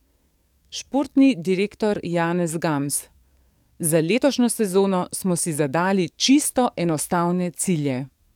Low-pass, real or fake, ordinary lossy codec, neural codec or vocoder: 19.8 kHz; fake; none; codec, 44.1 kHz, 7.8 kbps, DAC